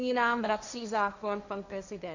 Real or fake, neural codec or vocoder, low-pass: fake; codec, 16 kHz, 1.1 kbps, Voila-Tokenizer; 7.2 kHz